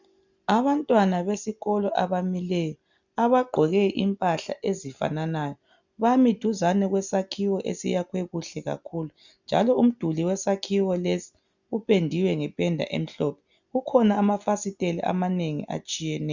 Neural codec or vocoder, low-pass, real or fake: none; 7.2 kHz; real